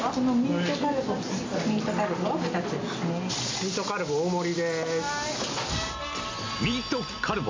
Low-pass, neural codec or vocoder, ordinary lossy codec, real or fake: 7.2 kHz; none; MP3, 48 kbps; real